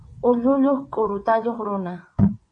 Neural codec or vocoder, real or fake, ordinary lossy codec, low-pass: vocoder, 22.05 kHz, 80 mel bands, WaveNeXt; fake; AAC, 64 kbps; 9.9 kHz